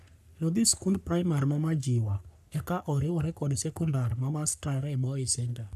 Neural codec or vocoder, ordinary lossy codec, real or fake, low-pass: codec, 44.1 kHz, 3.4 kbps, Pupu-Codec; none; fake; 14.4 kHz